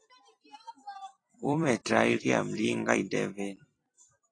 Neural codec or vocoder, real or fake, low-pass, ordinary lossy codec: vocoder, 44.1 kHz, 128 mel bands every 256 samples, BigVGAN v2; fake; 9.9 kHz; AAC, 32 kbps